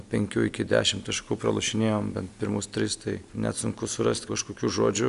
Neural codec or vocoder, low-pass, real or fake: none; 10.8 kHz; real